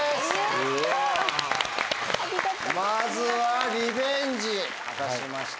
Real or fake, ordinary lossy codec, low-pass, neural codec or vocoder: real; none; none; none